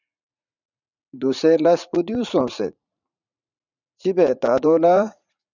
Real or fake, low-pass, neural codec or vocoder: fake; 7.2 kHz; vocoder, 44.1 kHz, 80 mel bands, Vocos